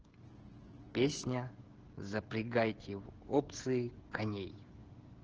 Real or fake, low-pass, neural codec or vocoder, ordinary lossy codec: fake; 7.2 kHz; codec, 16 kHz, 16 kbps, FreqCodec, smaller model; Opus, 16 kbps